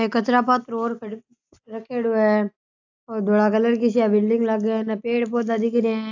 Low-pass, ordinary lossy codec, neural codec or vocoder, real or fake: 7.2 kHz; AAC, 48 kbps; none; real